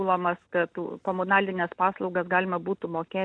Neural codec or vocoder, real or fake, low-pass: none; real; 9.9 kHz